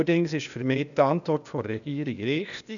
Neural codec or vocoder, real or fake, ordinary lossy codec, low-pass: codec, 16 kHz, 0.8 kbps, ZipCodec; fake; none; 7.2 kHz